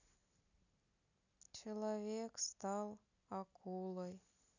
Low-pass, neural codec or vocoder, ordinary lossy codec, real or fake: 7.2 kHz; none; none; real